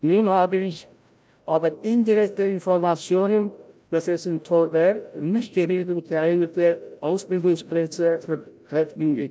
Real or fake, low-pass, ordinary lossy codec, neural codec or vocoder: fake; none; none; codec, 16 kHz, 0.5 kbps, FreqCodec, larger model